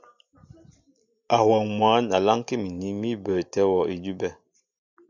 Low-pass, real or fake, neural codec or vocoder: 7.2 kHz; real; none